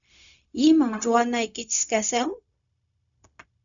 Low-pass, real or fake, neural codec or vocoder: 7.2 kHz; fake; codec, 16 kHz, 0.4 kbps, LongCat-Audio-Codec